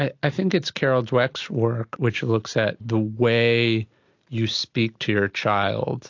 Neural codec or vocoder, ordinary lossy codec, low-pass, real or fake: none; AAC, 48 kbps; 7.2 kHz; real